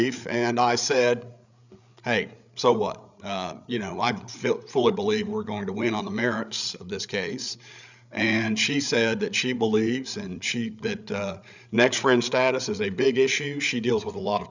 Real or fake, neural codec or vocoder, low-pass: fake; codec, 16 kHz, 8 kbps, FreqCodec, larger model; 7.2 kHz